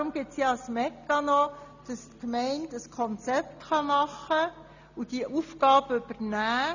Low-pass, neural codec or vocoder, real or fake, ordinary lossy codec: 7.2 kHz; none; real; none